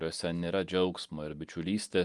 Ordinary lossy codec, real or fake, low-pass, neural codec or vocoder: Opus, 32 kbps; real; 10.8 kHz; none